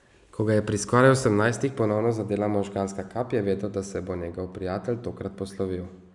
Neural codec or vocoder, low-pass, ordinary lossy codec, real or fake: none; 10.8 kHz; none; real